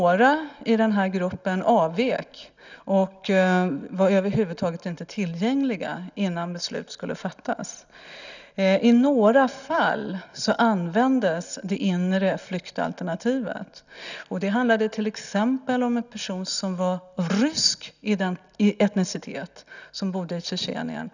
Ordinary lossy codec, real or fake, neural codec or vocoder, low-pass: none; real; none; 7.2 kHz